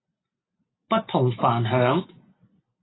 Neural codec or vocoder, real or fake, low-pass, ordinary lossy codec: none; real; 7.2 kHz; AAC, 16 kbps